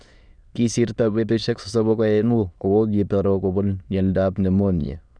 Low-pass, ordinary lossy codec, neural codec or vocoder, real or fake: 9.9 kHz; none; autoencoder, 22.05 kHz, a latent of 192 numbers a frame, VITS, trained on many speakers; fake